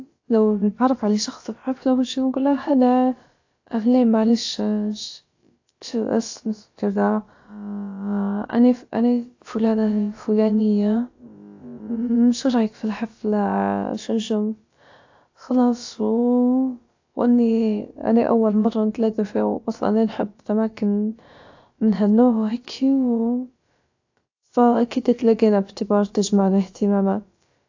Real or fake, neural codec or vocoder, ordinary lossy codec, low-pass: fake; codec, 16 kHz, about 1 kbps, DyCAST, with the encoder's durations; AAC, 48 kbps; 7.2 kHz